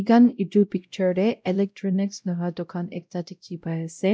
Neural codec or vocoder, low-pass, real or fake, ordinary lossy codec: codec, 16 kHz, 0.5 kbps, X-Codec, WavLM features, trained on Multilingual LibriSpeech; none; fake; none